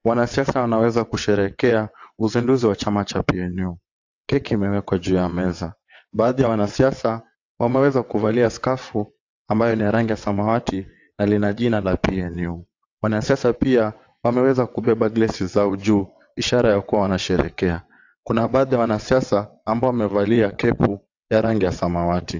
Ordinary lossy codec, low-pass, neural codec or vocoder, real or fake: AAC, 48 kbps; 7.2 kHz; vocoder, 22.05 kHz, 80 mel bands, WaveNeXt; fake